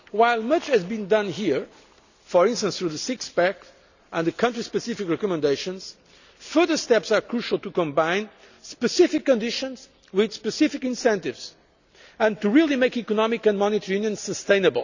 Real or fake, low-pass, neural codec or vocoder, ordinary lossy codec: real; 7.2 kHz; none; none